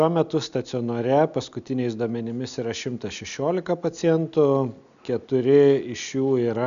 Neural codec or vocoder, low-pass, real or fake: none; 7.2 kHz; real